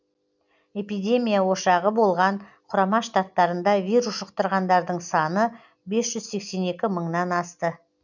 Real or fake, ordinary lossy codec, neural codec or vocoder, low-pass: real; none; none; 7.2 kHz